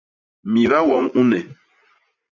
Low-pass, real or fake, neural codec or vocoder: 7.2 kHz; fake; vocoder, 44.1 kHz, 80 mel bands, Vocos